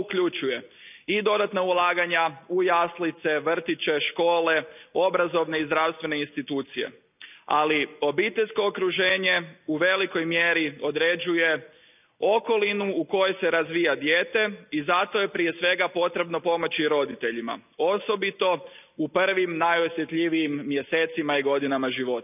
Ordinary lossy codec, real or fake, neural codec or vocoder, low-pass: none; real; none; 3.6 kHz